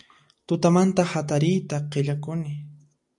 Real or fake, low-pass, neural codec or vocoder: real; 10.8 kHz; none